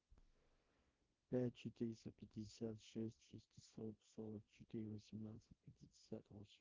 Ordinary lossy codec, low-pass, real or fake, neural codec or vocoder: Opus, 16 kbps; 7.2 kHz; fake; codec, 24 kHz, 0.9 kbps, WavTokenizer, small release